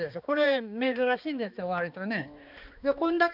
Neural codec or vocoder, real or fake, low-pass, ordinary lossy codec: codec, 16 kHz, 2 kbps, X-Codec, HuBERT features, trained on balanced general audio; fake; 5.4 kHz; none